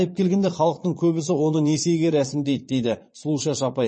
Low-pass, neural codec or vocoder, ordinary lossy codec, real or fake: 9.9 kHz; vocoder, 22.05 kHz, 80 mel bands, Vocos; MP3, 32 kbps; fake